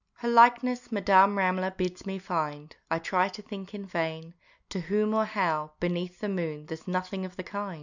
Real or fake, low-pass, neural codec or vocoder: real; 7.2 kHz; none